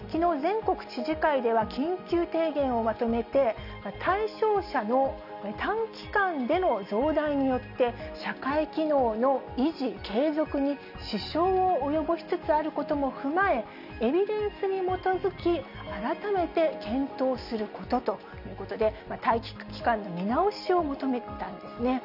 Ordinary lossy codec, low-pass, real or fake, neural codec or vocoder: none; 5.4 kHz; real; none